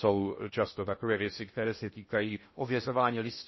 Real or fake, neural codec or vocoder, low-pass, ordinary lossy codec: fake; codec, 16 kHz in and 24 kHz out, 0.6 kbps, FocalCodec, streaming, 2048 codes; 7.2 kHz; MP3, 24 kbps